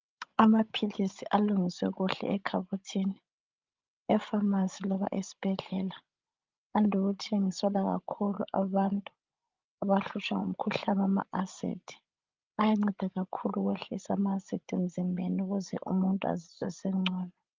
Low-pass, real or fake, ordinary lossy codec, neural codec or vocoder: 7.2 kHz; fake; Opus, 32 kbps; codec, 16 kHz, 16 kbps, FreqCodec, larger model